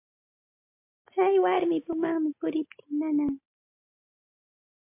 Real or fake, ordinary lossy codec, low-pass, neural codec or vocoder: real; MP3, 32 kbps; 3.6 kHz; none